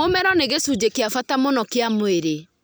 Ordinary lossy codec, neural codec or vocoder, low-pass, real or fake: none; none; none; real